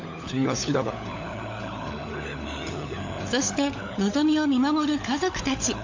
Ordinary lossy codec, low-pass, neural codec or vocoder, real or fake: AAC, 48 kbps; 7.2 kHz; codec, 16 kHz, 16 kbps, FunCodec, trained on LibriTTS, 50 frames a second; fake